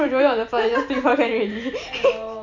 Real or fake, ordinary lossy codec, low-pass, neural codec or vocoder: fake; none; 7.2 kHz; vocoder, 44.1 kHz, 128 mel bands every 512 samples, BigVGAN v2